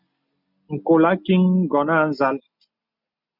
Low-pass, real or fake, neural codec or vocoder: 5.4 kHz; real; none